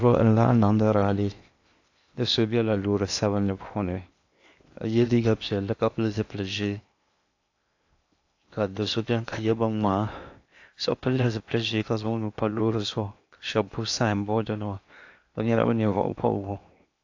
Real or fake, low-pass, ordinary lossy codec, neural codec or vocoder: fake; 7.2 kHz; AAC, 48 kbps; codec, 16 kHz in and 24 kHz out, 0.8 kbps, FocalCodec, streaming, 65536 codes